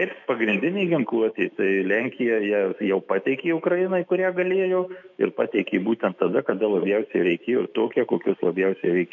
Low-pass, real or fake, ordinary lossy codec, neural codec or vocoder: 7.2 kHz; fake; MP3, 48 kbps; autoencoder, 48 kHz, 128 numbers a frame, DAC-VAE, trained on Japanese speech